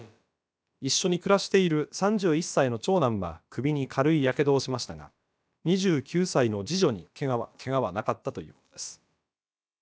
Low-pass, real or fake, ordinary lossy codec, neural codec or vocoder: none; fake; none; codec, 16 kHz, about 1 kbps, DyCAST, with the encoder's durations